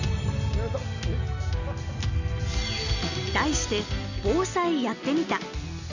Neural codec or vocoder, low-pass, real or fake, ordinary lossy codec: none; 7.2 kHz; real; none